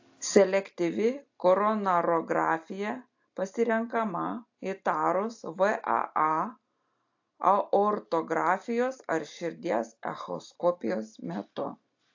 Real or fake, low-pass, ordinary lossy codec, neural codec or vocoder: real; 7.2 kHz; AAC, 48 kbps; none